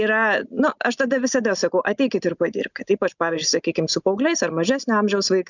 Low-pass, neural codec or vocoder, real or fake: 7.2 kHz; none; real